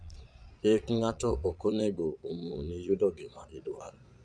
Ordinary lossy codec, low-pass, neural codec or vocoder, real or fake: none; 9.9 kHz; vocoder, 22.05 kHz, 80 mel bands, Vocos; fake